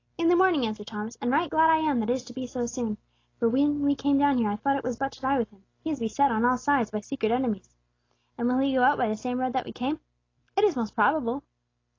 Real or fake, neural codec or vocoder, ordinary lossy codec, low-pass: real; none; AAC, 32 kbps; 7.2 kHz